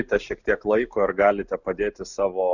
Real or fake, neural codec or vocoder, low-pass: real; none; 7.2 kHz